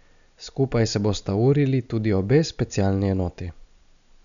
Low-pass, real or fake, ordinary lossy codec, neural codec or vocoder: 7.2 kHz; real; none; none